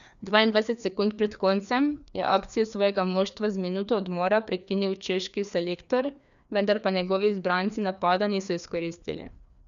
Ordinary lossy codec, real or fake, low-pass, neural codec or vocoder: none; fake; 7.2 kHz; codec, 16 kHz, 2 kbps, FreqCodec, larger model